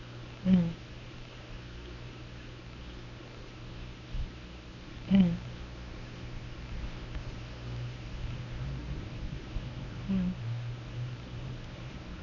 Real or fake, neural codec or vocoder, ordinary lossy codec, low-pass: fake; codec, 24 kHz, 0.9 kbps, WavTokenizer, medium speech release version 1; none; 7.2 kHz